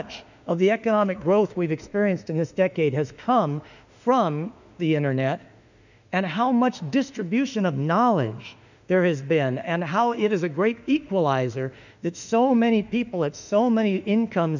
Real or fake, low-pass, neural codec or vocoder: fake; 7.2 kHz; autoencoder, 48 kHz, 32 numbers a frame, DAC-VAE, trained on Japanese speech